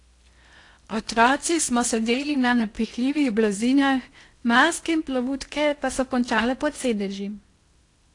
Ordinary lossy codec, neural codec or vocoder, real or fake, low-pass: AAC, 48 kbps; codec, 16 kHz in and 24 kHz out, 0.8 kbps, FocalCodec, streaming, 65536 codes; fake; 10.8 kHz